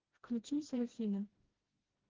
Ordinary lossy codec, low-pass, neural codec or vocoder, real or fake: Opus, 16 kbps; 7.2 kHz; codec, 16 kHz, 1 kbps, FreqCodec, smaller model; fake